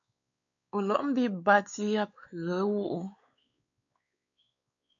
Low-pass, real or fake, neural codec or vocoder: 7.2 kHz; fake; codec, 16 kHz, 4 kbps, X-Codec, WavLM features, trained on Multilingual LibriSpeech